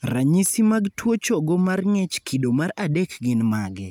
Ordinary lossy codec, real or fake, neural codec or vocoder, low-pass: none; real; none; none